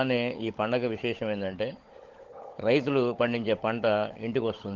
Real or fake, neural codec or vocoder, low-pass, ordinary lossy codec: fake; codec, 16 kHz, 16 kbps, FunCodec, trained on LibriTTS, 50 frames a second; 7.2 kHz; Opus, 16 kbps